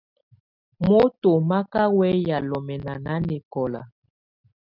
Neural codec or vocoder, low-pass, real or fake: none; 5.4 kHz; real